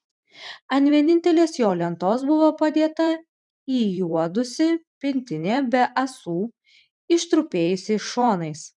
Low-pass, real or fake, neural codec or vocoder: 10.8 kHz; fake; vocoder, 44.1 kHz, 128 mel bands every 512 samples, BigVGAN v2